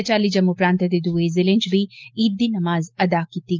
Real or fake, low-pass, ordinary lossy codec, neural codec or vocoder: real; 7.2 kHz; Opus, 32 kbps; none